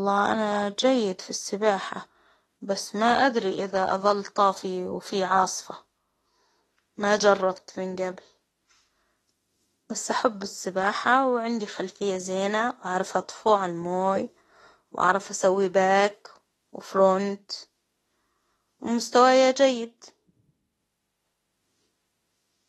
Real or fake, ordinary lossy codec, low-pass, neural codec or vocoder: fake; AAC, 32 kbps; 19.8 kHz; autoencoder, 48 kHz, 32 numbers a frame, DAC-VAE, trained on Japanese speech